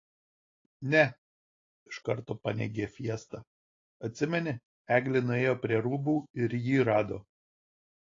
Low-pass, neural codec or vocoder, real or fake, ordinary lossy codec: 7.2 kHz; none; real; AAC, 32 kbps